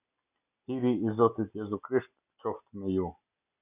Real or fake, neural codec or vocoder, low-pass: real; none; 3.6 kHz